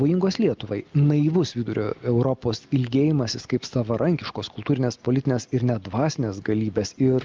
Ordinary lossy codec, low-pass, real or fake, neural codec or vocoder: Opus, 32 kbps; 7.2 kHz; real; none